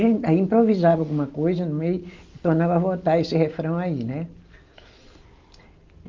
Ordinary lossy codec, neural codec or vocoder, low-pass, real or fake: Opus, 24 kbps; none; 7.2 kHz; real